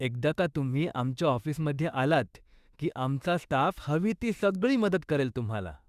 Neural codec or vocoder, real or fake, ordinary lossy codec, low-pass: autoencoder, 48 kHz, 32 numbers a frame, DAC-VAE, trained on Japanese speech; fake; Opus, 64 kbps; 14.4 kHz